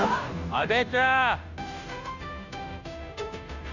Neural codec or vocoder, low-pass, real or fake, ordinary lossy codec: codec, 16 kHz, 0.5 kbps, FunCodec, trained on Chinese and English, 25 frames a second; 7.2 kHz; fake; none